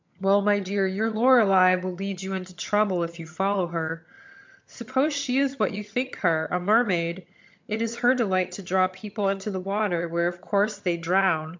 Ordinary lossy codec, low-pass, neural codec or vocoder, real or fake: MP3, 64 kbps; 7.2 kHz; vocoder, 22.05 kHz, 80 mel bands, HiFi-GAN; fake